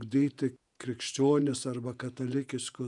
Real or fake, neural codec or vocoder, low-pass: fake; vocoder, 48 kHz, 128 mel bands, Vocos; 10.8 kHz